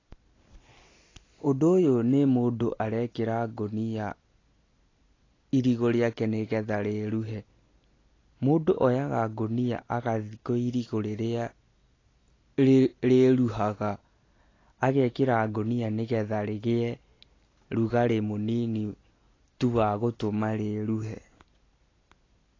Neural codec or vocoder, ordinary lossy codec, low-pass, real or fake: none; AAC, 32 kbps; 7.2 kHz; real